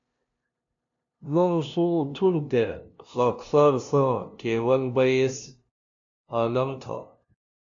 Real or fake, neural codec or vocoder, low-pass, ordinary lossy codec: fake; codec, 16 kHz, 0.5 kbps, FunCodec, trained on LibriTTS, 25 frames a second; 7.2 kHz; AAC, 64 kbps